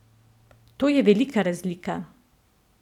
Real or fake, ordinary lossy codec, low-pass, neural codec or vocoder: fake; none; 19.8 kHz; vocoder, 44.1 kHz, 128 mel bands every 256 samples, BigVGAN v2